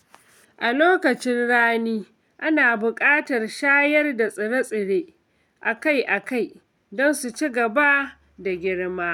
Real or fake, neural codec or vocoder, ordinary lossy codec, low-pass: real; none; none; 19.8 kHz